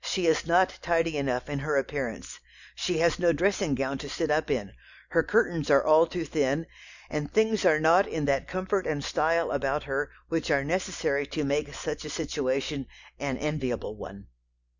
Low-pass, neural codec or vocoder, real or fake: 7.2 kHz; none; real